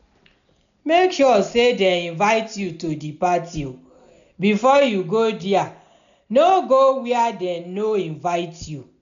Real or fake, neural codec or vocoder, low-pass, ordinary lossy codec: real; none; 7.2 kHz; none